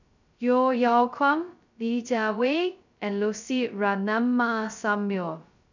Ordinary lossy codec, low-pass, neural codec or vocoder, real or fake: none; 7.2 kHz; codec, 16 kHz, 0.2 kbps, FocalCodec; fake